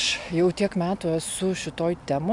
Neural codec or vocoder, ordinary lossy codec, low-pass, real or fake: none; MP3, 96 kbps; 10.8 kHz; real